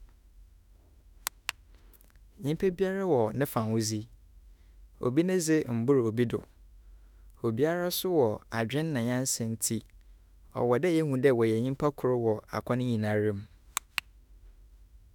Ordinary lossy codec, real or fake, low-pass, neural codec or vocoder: none; fake; 19.8 kHz; autoencoder, 48 kHz, 32 numbers a frame, DAC-VAE, trained on Japanese speech